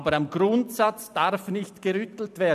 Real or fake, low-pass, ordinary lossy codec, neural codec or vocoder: fake; 14.4 kHz; none; vocoder, 44.1 kHz, 128 mel bands every 256 samples, BigVGAN v2